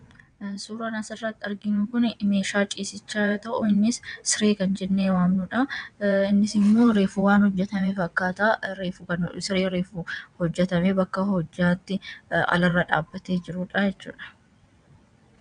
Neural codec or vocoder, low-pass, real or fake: vocoder, 22.05 kHz, 80 mel bands, WaveNeXt; 9.9 kHz; fake